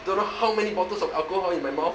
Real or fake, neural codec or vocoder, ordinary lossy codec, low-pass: real; none; none; none